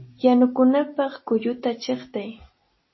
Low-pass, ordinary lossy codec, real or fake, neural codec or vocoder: 7.2 kHz; MP3, 24 kbps; real; none